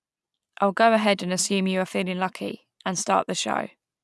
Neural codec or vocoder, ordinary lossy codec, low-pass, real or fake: none; none; none; real